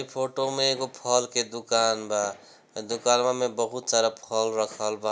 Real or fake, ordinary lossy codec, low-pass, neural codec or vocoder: real; none; none; none